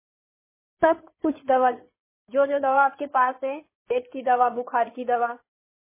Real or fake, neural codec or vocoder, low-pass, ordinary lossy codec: fake; codec, 16 kHz in and 24 kHz out, 2.2 kbps, FireRedTTS-2 codec; 3.6 kHz; MP3, 16 kbps